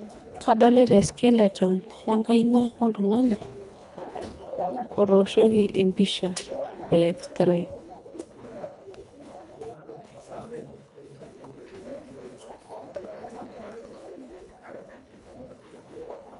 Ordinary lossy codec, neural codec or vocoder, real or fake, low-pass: none; codec, 24 kHz, 1.5 kbps, HILCodec; fake; 10.8 kHz